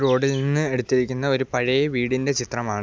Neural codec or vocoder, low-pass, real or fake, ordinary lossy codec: none; none; real; none